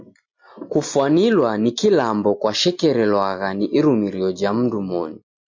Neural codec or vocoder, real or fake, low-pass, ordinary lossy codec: none; real; 7.2 kHz; MP3, 48 kbps